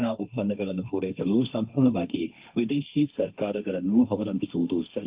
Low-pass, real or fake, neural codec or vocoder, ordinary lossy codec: 3.6 kHz; fake; codec, 16 kHz, 1.1 kbps, Voila-Tokenizer; Opus, 24 kbps